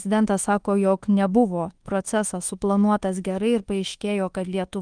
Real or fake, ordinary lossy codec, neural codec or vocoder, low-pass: fake; Opus, 24 kbps; codec, 24 kHz, 1.2 kbps, DualCodec; 9.9 kHz